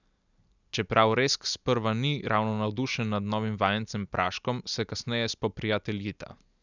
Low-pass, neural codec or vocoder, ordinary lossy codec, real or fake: 7.2 kHz; none; none; real